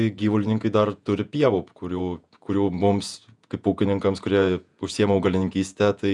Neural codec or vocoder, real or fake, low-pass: vocoder, 48 kHz, 128 mel bands, Vocos; fake; 10.8 kHz